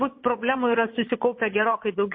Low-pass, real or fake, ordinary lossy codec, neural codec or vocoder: 7.2 kHz; fake; MP3, 24 kbps; vocoder, 22.05 kHz, 80 mel bands, Vocos